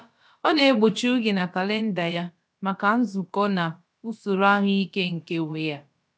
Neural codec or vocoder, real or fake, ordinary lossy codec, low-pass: codec, 16 kHz, about 1 kbps, DyCAST, with the encoder's durations; fake; none; none